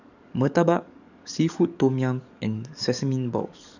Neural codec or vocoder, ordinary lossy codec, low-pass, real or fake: codec, 44.1 kHz, 7.8 kbps, DAC; none; 7.2 kHz; fake